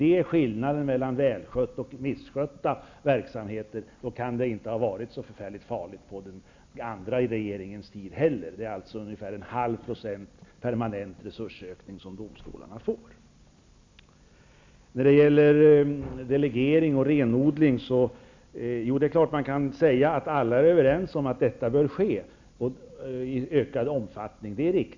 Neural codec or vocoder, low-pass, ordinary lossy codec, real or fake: none; 7.2 kHz; MP3, 64 kbps; real